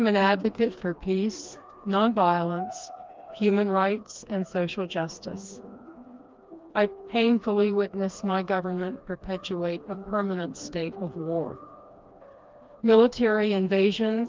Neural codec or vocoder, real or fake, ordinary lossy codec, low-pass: codec, 16 kHz, 2 kbps, FreqCodec, smaller model; fake; Opus, 32 kbps; 7.2 kHz